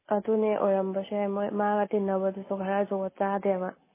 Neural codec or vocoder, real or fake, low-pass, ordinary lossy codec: none; real; 3.6 kHz; MP3, 16 kbps